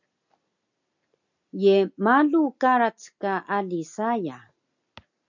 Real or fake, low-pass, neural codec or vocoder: real; 7.2 kHz; none